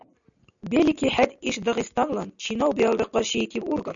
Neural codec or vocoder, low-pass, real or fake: none; 7.2 kHz; real